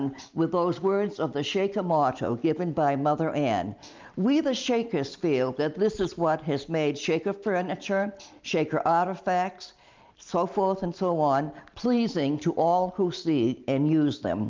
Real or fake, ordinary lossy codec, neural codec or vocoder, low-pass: real; Opus, 24 kbps; none; 7.2 kHz